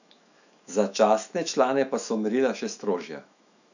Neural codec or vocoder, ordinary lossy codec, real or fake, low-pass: autoencoder, 48 kHz, 128 numbers a frame, DAC-VAE, trained on Japanese speech; none; fake; 7.2 kHz